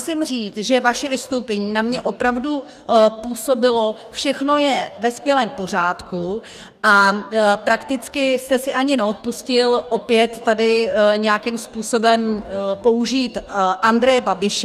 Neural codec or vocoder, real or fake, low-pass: codec, 44.1 kHz, 2.6 kbps, DAC; fake; 14.4 kHz